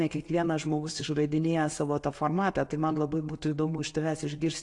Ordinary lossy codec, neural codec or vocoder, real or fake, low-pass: AAC, 48 kbps; none; real; 10.8 kHz